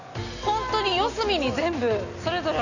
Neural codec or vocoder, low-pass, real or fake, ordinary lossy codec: none; 7.2 kHz; real; none